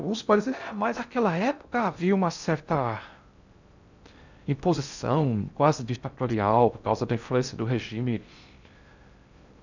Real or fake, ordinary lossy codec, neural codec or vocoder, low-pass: fake; none; codec, 16 kHz in and 24 kHz out, 0.6 kbps, FocalCodec, streaming, 2048 codes; 7.2 kHz